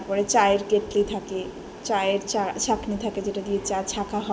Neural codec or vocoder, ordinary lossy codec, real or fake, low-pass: none; none; real; none